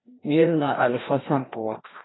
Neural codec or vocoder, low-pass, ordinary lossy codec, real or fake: codec, 16 kHz, 1 kbps, FreqCodec, larger model; 7.2 kHz; AAC, 16 kbps; fake